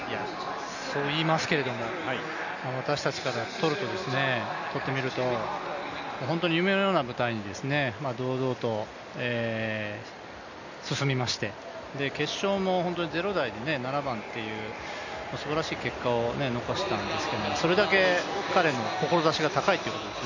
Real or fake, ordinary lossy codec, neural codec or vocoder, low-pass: real; none; none; 7.2 kHz